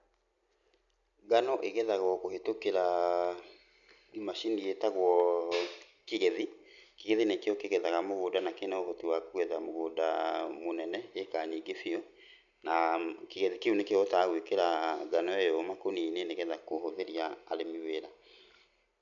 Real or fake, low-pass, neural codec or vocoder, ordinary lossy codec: real; 7.2 kHz; none; none